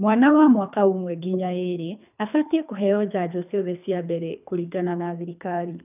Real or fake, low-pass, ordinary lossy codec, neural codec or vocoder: fake; 3.6 kHz; none; codec, 24 kHz, 3 kbps, HILCodec